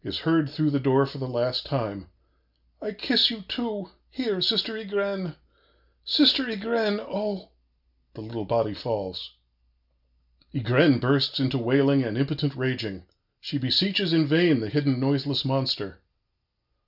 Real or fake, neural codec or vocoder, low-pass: real; none; 5.4 kHz